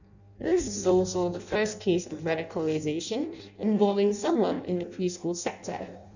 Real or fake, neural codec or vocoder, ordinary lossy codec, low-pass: fake; codec, 16 kHz in and 24 kHz out, 0.6 kbps, FireRedTTS-2 codec; none; 7.2 kHz